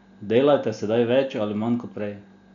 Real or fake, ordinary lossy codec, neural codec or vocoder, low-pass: real; none; none; 7.2 kHz